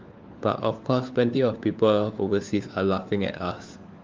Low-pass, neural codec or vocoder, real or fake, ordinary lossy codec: 7.2 kHz; codec, 16 kHz, 4 kbps, FunCodec, trained on LibriTTS, 50 frames a second; fake; Opus, 24 kbps